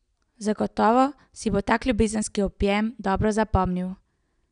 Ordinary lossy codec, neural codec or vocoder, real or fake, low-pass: none; none; real; 9.9 kHz